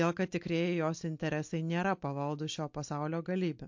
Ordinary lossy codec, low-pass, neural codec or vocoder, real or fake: MP3, 48 kbps; 7.2 kHz; none; real